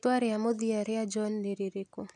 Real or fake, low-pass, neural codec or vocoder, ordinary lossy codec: real; 10.8 kHz; none; none